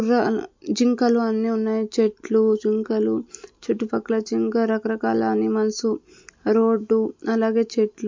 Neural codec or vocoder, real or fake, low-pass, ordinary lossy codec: none; real; 7.2 kHz; MP3, 48 kbps